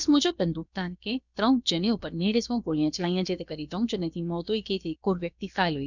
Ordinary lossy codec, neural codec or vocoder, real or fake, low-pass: none; codec, 16 kHz, about 1 kbps, DyCAST, with the encoder's durations; fake; 7.2 kHz